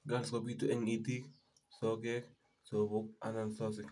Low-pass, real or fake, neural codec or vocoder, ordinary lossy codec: 10.8 kHz; real; none; none